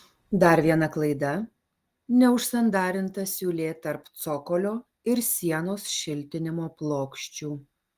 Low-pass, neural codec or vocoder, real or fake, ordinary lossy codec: 14.4 kHz; none; real; Opus, 24 kbps